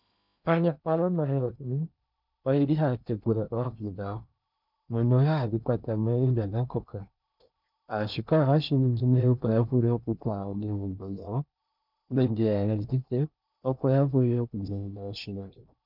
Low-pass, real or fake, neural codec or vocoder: 5.4 kHz; fake; codec, 16 kHz in and 24 kHz out, 0.8 kbps, FocalCodec, streaming, 65536 codes